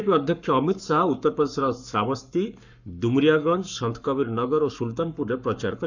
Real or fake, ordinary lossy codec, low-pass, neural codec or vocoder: fake; none; 7.2 kHz; codec, 44.1 kHz, 7.8 kbps, Pupu-Codec